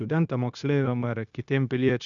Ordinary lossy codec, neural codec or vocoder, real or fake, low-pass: MP3, 96 kbps; codec, 16 kHz, 0.9 kbps, LongCat-Audio-Codec; fake; 7.2 kHz